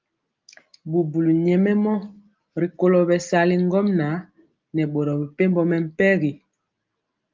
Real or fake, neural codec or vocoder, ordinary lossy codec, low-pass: real; none; Opus, 32 kbps; 7.2 kHz